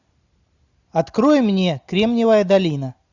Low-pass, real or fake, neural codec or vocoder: 7.2 kHz; real; none